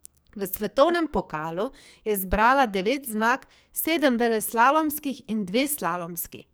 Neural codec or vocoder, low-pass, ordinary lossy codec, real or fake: codec, 44.1 kHz, 2.6 kbps, SNAC; none; none; fake